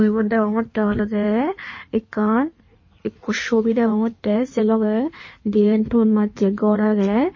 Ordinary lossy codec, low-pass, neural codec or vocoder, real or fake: MP3, 32 kbps; 7.2 kHz; codec, 16 kHz in and 24 kHz out, 1.1 kbps, FireRedTTS-2 codec; fake